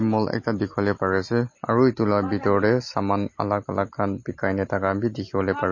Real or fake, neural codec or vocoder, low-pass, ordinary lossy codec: real; none; 7.2 kHz; MP3, 32 kbps